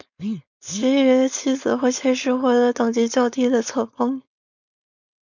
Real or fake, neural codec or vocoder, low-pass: fake; codec, 16 kHz, 4.8 kbps, FACodec; 7.2 kHz